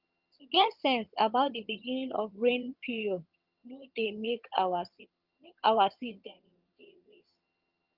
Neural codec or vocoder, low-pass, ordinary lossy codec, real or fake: vocoder, 22.05 kHz, 80 mel bands, HiFi-GAN; 5.4 kHz; Opus, 24 kbps; fake